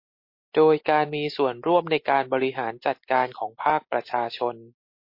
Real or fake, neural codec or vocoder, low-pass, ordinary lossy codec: real; none; 5.4 kHz; MP3, 32 kbps